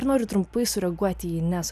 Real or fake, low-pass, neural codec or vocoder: real; 14.4 kHz; none